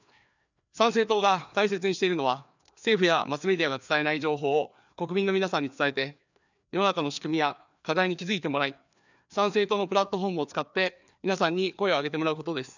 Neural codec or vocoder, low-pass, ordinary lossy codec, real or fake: codec, 16 kHz, 2 kbps, FreqCodec, larger model; 7.2 kHz; none; fake